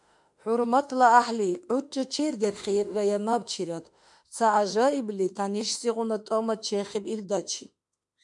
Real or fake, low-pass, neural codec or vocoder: fake; 10.8 kHz; autoencoder, 48 kHz, 32 numbers a frame, DAC-VAE, trained on Japanese speech